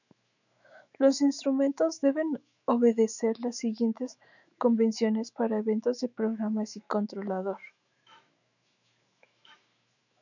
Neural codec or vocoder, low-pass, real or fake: autoencoder, 48 kHz, 128 numbers a frame, DAC-VAE, trained on Japanese speech; 7.2 kHz; fake